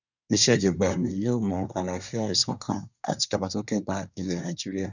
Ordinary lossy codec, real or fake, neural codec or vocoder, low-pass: none; fake; codec, 24 kHz, 1 kbps, SNAC; 7.2 kHz